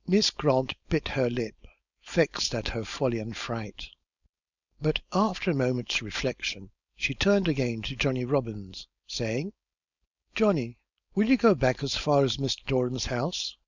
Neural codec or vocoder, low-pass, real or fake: codec, 16 kHz, 4.8 kbps, FACodec; 7.2 kHz; fake